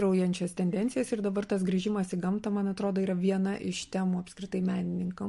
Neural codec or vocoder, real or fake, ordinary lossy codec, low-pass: none; real; MP3, 48 kbps; 14.4 kHz